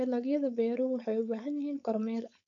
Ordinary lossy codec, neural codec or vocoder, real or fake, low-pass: none; codec, 16 kHz, 4.8 kbps, FACodec; fake; 7.2 kHz